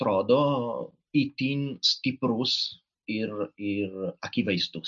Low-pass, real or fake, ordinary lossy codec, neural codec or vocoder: 7.2 kHz; real; MP3, 48 kbps; none